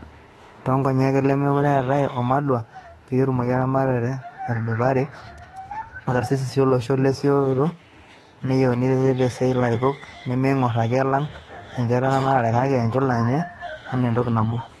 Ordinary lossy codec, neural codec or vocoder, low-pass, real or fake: AAC, 32 kbps; autoencoder, 48 kHz, 32 numbers a frame, DAC-VAE, trained on Japanese speech; 19.8 kHz; fake